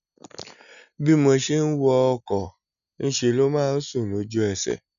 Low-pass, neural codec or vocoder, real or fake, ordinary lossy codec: 7.2 kHz; none; real; none